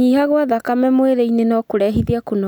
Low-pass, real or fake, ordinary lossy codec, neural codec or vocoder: 19.8 kHz; real; none; none